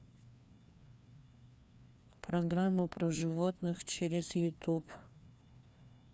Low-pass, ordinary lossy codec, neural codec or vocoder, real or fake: none; none; codec, 16 kHz, 2 kbps, FreqCodec, larger model; fake